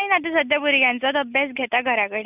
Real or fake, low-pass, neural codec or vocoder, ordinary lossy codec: real; 3.6 kHz; none; none